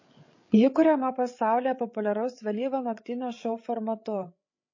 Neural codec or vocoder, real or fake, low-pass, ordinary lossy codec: codec, 16 kHz, 8 kbps, FreqCodec, larger model; fake; 7.2 kHz; MP3, 32 kbps